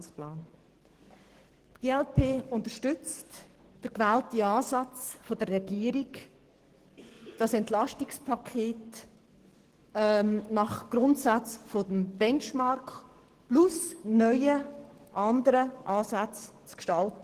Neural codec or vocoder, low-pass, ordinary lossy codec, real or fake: codec, 44.1 kHz, 7.8 kbps, DAC; 14.4 kHz; Opus, 16 kbps; fake